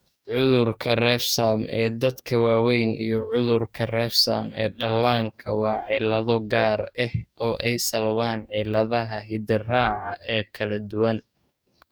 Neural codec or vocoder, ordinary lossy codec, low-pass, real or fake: codec, 44.1 kHz, 2.6 kbps, DAC; none; none; fake